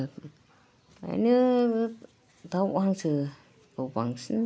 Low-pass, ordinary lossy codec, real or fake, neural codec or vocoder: none; none; real; none